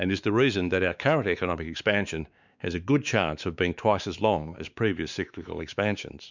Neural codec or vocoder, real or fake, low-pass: codec, 16 kHz, 4 kbps, X-Codec, WavLM features, trained on Multilingual LibriSpeech; fake; 7.2 kHz